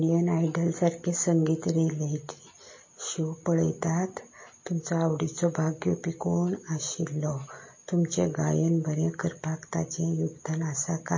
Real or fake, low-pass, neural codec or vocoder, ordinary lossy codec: real; 7.2 kHz; none; MP3, 32 kbps